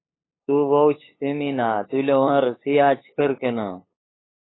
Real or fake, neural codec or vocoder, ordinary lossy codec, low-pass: fake; codec, 16 kHz, 8 kbps, FunCodec, trained on LibriTTS, 25 frames a second; AAC, 16 kbps; 7.2 kHz